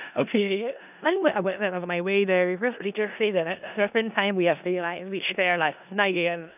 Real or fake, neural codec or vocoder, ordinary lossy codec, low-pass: fake; codec, 16 kHz in and 24 kHz out, 0.4 kbps, LongCat-Audio-Codec, four codebook decoder; none; 3.6 kHz